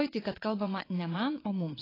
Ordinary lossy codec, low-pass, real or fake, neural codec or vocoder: AAC, 24 kbps; 5.4 kHz; fake; vocoder, 22.05 kHz, 80 mel bands, WaveNeXt